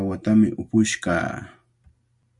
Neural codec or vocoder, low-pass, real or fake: none; 10.8 kHz; real